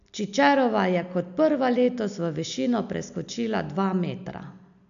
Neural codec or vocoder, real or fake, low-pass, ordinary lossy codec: none; real; 7.2 kHz; none